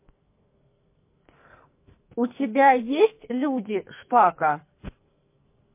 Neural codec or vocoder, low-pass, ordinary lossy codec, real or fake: codec, 44.1 kHz, 2.6 kbps, SNAC; 3.6 kHz; MP3, 24 kbps; fake